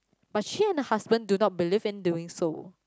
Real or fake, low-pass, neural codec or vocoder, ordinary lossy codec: real; none; none; none